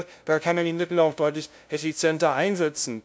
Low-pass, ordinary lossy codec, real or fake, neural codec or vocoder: none; none; fake; codec, 16 kHz, 0.5 kbps, FunCodec, trained on LibriTTS, 25 frames a second